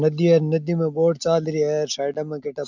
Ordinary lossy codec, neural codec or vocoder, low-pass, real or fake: none; none; 7.2 kHz; real